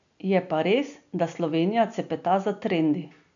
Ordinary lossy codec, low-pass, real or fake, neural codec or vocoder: none; 7.2 kHz; real; none